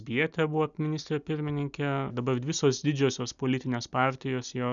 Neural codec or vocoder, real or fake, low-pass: none; real; 7.2 kHz